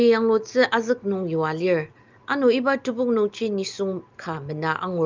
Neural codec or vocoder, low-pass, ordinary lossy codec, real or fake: none; 7.2 kHz; Opus, 24 kbps; real